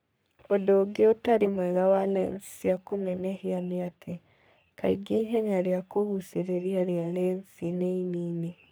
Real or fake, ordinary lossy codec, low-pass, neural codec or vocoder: fake; none; none; codec, 44.1 kHz, 3.4 kbps, Pupu-Codec